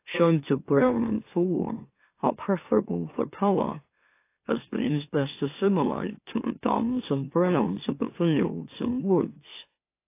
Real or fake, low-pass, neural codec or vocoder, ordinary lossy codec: fake; 3.6 kHz; autoencoder, 44.1 kHz, a latent of 192 numbers a frame, MeloTTS; AAC, 24 kbps